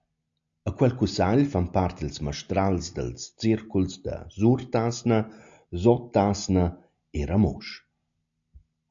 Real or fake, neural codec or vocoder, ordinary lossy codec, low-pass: real; none; AAC, 64 kbps; 7.2 kHz